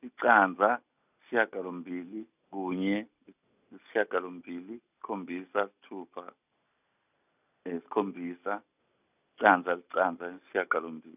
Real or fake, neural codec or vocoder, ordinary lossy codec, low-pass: real; none; none; 3.6 kHz